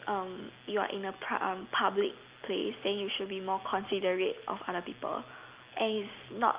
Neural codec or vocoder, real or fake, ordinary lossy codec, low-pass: none; real; Opus, 24 kbps; 3.6 kHz